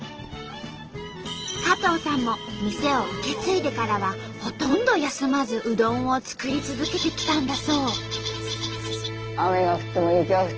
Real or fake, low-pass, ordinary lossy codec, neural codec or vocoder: real; 7.2 kHz; Opus, 16 kbps; none